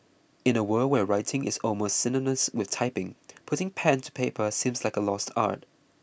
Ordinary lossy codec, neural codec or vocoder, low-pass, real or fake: none; none; none; real